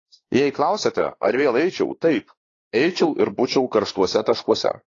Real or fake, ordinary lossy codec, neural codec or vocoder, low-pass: fake; AAC, 32 kbps; codec, 16 kHz, 2 kbps, X-Codec, WavLM features, trained on Multilingual LibriSpeech; 7.2 kHz